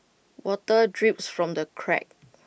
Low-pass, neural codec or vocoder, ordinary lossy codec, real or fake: none; none; none; real